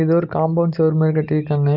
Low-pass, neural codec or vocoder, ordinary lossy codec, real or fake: 5.4 kHz; none; Opus, 24 kbps; real